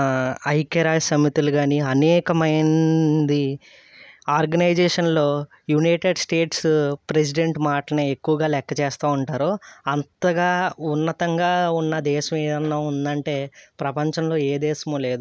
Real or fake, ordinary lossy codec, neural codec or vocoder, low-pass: real; none; none; none